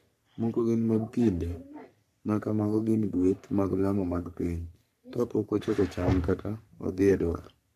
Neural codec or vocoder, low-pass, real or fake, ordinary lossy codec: codec, 44.1 kHz, 3.4 kbps, Pupu-Codec; 14.4 kHz; fake; none